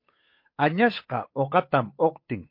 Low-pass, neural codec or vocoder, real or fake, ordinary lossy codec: 5.4 kHz; codec, 16 kHz, 8 kbps, FunCodec, trained on Chinese and English, 25 frames a second; fake; MP3, 32 kbps